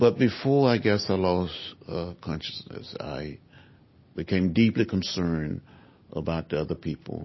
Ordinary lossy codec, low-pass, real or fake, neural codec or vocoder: MP3, 24 kbps; 7.2 kHz; real; none